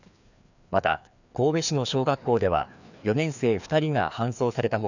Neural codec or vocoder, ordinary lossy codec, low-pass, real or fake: codec, 16 kHz, 2 kbps, FreqCodec, larger model; none; 7.2 kHz; fake